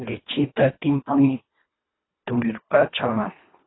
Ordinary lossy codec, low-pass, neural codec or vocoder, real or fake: AAC, 16 kbps; 7.2 kHz; codec, 24 kHz, 1.5 kbps, HILCodec; fake